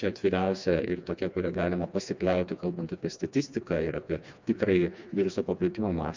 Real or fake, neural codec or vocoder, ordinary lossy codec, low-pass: fake; codec, 16 kHz, 2 kbps, FreqCodec, smaller model; MP3, 64 kbps; 7.2 kHz